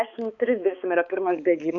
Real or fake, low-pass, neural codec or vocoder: fake; 7.2 kHz; codec, 16 kHz, 4 kbps, X-Codec, HuBERT features, trained on balanced general audio